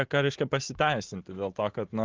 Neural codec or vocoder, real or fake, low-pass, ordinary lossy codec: none; real; 7.2 kHz; Opus, 16 kbps